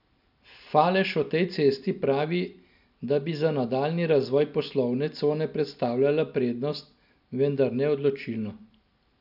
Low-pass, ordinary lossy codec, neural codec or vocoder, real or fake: 5.4 kHz; none; none; real